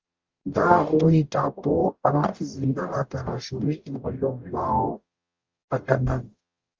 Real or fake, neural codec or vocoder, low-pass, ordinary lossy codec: fake; codec, 44.1 kHz, 0.9 kbps, DAC; 7.2 kHz; Opus, 32 kbps